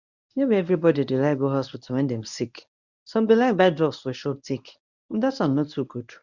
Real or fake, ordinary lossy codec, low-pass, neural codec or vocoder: fake; none; 7.2 kHz; codec, 24 kHz, 0.9 kbps, WavTokenizer, medium speech release version 1